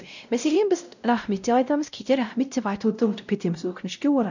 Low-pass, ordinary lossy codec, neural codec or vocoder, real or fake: 7.2 kHz; none; codec, 16 kHz, 0.5 kbps, X-Codec, WavLM features, trained on Multilingual LibriSpeech; fake